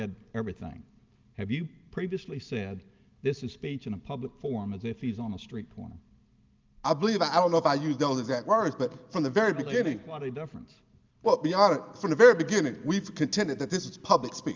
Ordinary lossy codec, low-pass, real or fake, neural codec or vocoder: Opus, 32 kbps; 7.2 kHz; real; none